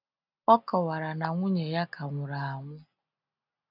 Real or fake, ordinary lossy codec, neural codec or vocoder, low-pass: real; none; none; 5.4 kHz